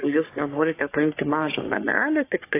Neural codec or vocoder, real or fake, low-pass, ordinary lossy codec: codec, 44.1 kHz, 3.4 kbps, Pupu-Codec; fake; 3.6 kHz; AAC, 24 kbps